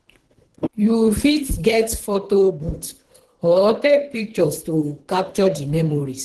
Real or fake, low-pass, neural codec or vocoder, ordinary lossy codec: fake; 10.8 kHz; codec, 24 kHz, 3 kbps, HILCodec; Opus, 16 kbps